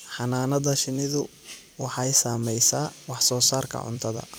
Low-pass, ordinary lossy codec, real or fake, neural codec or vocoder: none; none; fake; vocoder, 44.1 kHz, 128 mel bands every 256 samples, BigVGAN v2